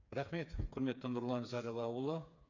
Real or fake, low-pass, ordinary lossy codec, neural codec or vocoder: fake; 7.2 kHz; none; codec, 16 kHz, 4 kbps, FreqCodec, smaller model